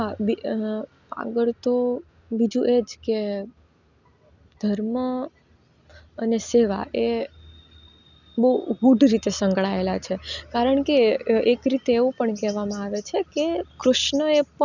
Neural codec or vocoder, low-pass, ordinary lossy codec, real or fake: none; 7.2 kHz; none; real